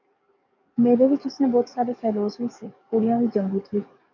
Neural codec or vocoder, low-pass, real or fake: codec, 44.1 kHz, 7.8 kbps, DAC; 7.2 kHz; fake